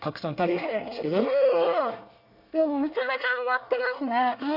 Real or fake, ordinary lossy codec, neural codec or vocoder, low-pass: fake; none; codec, 24 kHz, 1 kbps, SNAC; 5.4 kHz